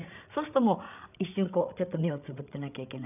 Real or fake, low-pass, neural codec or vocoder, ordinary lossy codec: fake; 3.6 kHz; codec, 16 kHz, 8 kbps, FreqCodec, larger model; none